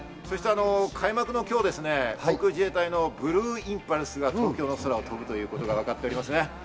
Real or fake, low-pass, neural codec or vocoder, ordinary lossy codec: real; none; none; none